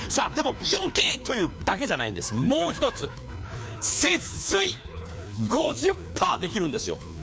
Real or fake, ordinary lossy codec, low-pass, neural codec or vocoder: fake; none; none; codec, 16 kHz, 2 kbps, FreqCodec, larger model